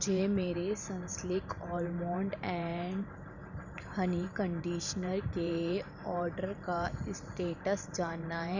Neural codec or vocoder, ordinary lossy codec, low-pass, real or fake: vocoder, 44.1 kHz, 128 mel bands every 512 samples, BigVGAN v2; none; 7.2 kHz; fake